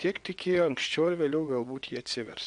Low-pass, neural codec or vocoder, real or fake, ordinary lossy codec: 9.9 kHz; vocoder, 22.05 kHz, 80 mel bands, WaveNeXt; fake; AAC, 64 kbps